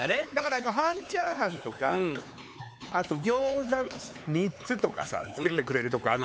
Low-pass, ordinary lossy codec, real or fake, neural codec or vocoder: none; none; fake; codec, 16 kHz, 4 kbps, X-Codec, HuBERT features, trained on LibriSpeech